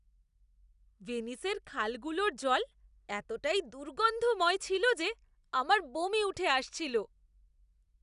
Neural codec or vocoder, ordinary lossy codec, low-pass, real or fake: none; none; 10.8 kHz; real